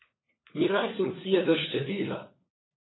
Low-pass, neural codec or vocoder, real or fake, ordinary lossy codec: 7.2 kHz; codec, 16 kHz, 4 kbps, FunCodec, trained on LibriTTS, 50 frames a second; fake; AAC, 16 kbps